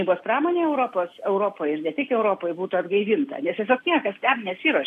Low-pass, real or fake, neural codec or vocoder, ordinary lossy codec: 14.4 kHz; real; none; AAC, 64 kbps